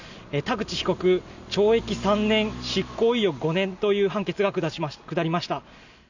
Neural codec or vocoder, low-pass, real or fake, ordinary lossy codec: none; 7.2 kHz; real; none